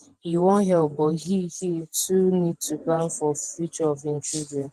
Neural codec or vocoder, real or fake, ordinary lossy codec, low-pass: vocoder, 48 kHz, 128 mel bands, Vocos; fake; Opus, 24 kbps; 14.4 kHz